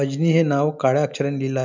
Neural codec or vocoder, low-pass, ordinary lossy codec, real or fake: none; 7.2 kHz; none; real